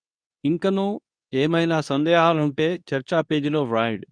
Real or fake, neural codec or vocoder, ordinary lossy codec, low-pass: fake; codec, 24 kHz, 0.9 kbps, WavTokenizer, medium speech release version 2; none; 9.9 kHz